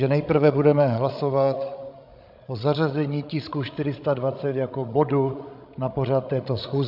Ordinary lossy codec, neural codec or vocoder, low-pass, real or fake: MP3, 48 kbps; codec, 16 kHz, 16 kbps, FreqCodec, larger model; 5.4 kHz; fake